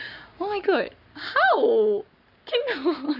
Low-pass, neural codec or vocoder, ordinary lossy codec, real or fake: 5.4 kHz; codec, 44.1 kHz, 7.8 kbps, Pupu-Codec; none; fake